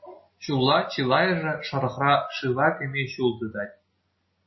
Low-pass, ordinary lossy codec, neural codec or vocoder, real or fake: 7.2 kHz; MP3, 24 kbps; none; real